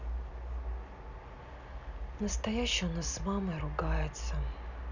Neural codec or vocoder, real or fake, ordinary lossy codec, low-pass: none; real; none; 7.2 kHz